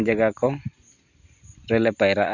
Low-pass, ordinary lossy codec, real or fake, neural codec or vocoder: 7.2 kHz; none; real; none